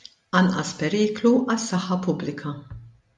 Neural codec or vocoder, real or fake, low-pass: none; real; 10.8 kHz